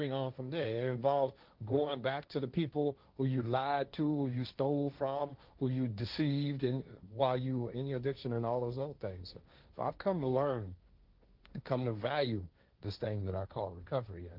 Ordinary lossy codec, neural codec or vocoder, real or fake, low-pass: Opus, 24 kbps; codec, 16 kHz, 1.1 kbps, Voila-Tokenizer; fake; 5.4 kHz